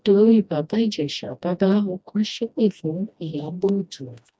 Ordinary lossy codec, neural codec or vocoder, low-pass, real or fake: none; codec, 16 kHz, 1 kbps, FreqCodec, smaller model; none; fake